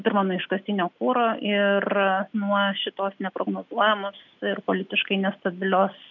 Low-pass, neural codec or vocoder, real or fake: 7.2 kHz; none; real